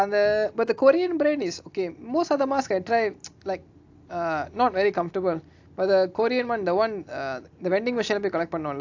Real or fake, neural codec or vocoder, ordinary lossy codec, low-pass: real; none; AAC, 48 kbps; 7.2 kHz